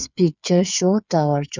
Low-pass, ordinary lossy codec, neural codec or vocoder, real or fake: 7.2 kHz; none; codec, 16 kHz, 8 kbps, FreqCodec, smaller model; fake